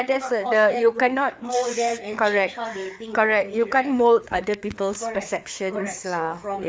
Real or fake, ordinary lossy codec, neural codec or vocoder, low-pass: fake; none; codec, 16 kHz, 4 kbps, FreqCodec, larger model; none